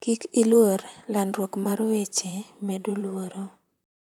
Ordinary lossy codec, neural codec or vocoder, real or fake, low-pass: none; vocoder, 44.1 kHz, 128 mel bands, Pupu-Vocoder; fake; 19.8 kHz